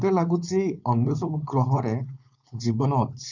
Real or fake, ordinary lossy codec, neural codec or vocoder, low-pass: fake; none; codec, 16 kHz, 4.8 kbps, FACodec; 7.2 kHz